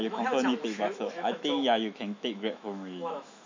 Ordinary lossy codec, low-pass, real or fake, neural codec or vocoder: MP3, 64 kbps; 7.2 kHz; fake; autoencoder, 48 kHz, 128 numbers a frame, DAC-VAE, trained on Japanese speech